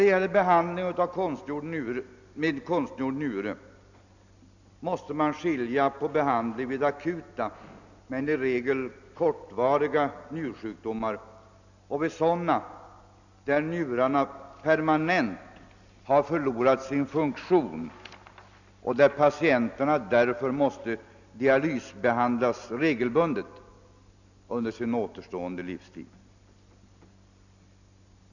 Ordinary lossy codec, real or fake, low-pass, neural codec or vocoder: none; real; 7.2 kHz; none